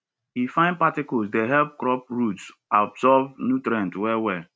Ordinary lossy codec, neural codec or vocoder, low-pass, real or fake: none; none; none; real